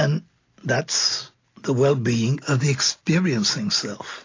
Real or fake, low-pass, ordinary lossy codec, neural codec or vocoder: real; 7.2 kHz; AAC, 32 kbps; none